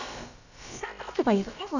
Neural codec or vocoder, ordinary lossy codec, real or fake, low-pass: codec, 16 kHz, about 1 kbps, DyCAST, with the encoder's durations; none; fake; 7.2 kHz